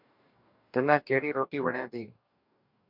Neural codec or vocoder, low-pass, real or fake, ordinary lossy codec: codec, 44.1 kHz, 2.6 kbps, DAC; 5.4 kHz; fake; MP3, 48 kbps